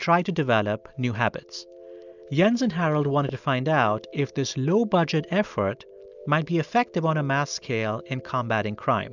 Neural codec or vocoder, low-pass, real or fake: none; 7.2 kHz; real